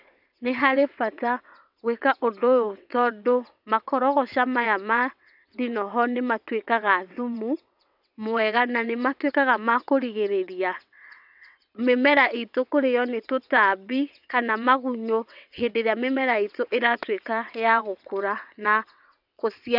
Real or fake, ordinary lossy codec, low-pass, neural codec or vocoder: fake; none; 5.4 kHz; vocoder, 22.05 kHz, 80 mel bands, WaveNeXt